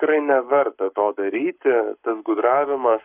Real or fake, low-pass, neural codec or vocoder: fake; 3.6 kHz; codec, 44.1 kHz, 7.8 kbps, Pupu-Codec